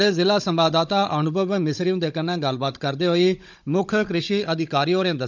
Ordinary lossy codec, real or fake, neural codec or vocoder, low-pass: none; fake; codec, 16 kHz, 16 kbps, FunCodec, trained on Chinese and English, 50 frames a second; 7.2 kHz